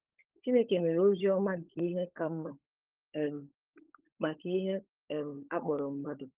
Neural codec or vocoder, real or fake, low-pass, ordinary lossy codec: codec, 16 kHz, 16 kbps, FunCodec, trained on LibriTTS, 50 frames a second; fake; 3.6 kHz; Opus, 32 kbps